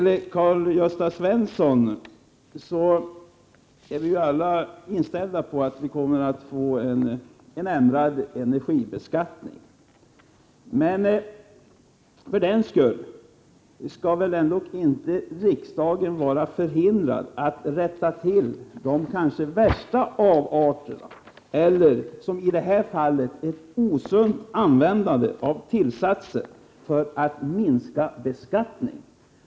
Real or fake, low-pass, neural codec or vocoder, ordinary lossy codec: real; none; none; none